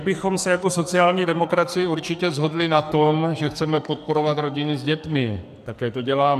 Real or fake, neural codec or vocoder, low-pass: fake; codec, 44.1 kHz, 2.6 kbps, SNAC; 14.4 kHz